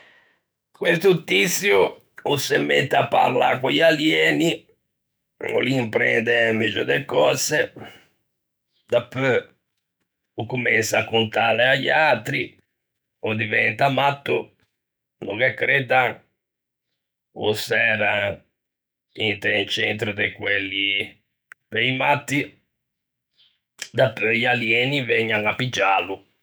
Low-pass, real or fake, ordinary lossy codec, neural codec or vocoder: none; fake; none; autoencoder, 48 kHz, 128 numbers a frame, DAC-VAE, trained on Japanese speech